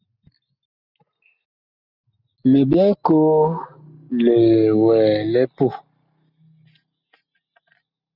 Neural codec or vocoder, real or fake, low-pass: none; real; 5.4 kHz